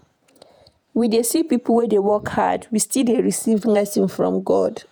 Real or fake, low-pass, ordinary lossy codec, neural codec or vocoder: fake; none; none; vocoder, 48 kHz, 128 mel bands, Vocos